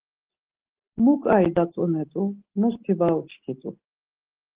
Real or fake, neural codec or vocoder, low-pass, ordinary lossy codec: real; none; 3.6 kHz; Opus, 16 kbps